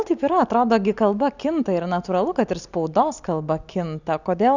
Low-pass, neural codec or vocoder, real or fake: 7.2 kHz; none; real